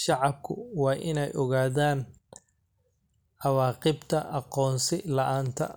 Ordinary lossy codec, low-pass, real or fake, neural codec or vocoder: none; none; real; none